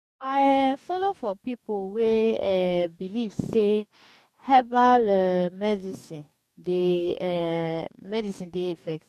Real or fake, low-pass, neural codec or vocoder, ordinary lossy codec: fake; 14.4 kHz; codec, 44.1 kHz, 2.6 kbps, DAC; none